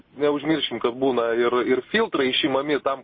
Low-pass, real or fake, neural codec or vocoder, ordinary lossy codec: 7.2 kHz; real; none; MP3, 24 kbps